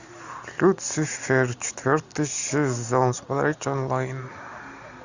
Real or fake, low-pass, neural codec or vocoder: real; 7.2 kHz; none